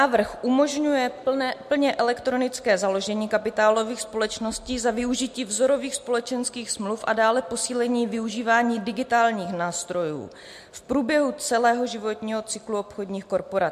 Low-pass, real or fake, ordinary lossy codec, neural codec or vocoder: 14.4 kHz; real; MP3, 64 kbps; none